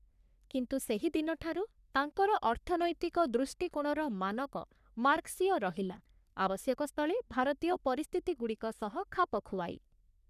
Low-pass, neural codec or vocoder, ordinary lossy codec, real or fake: 14.4 kHz; codec, 44.1 kHz, 3.4 kbps, Pupu-Codec; none; fake